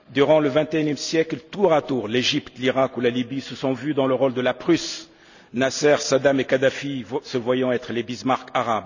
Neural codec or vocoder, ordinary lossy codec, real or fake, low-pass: none; none; real; 7.2 kHz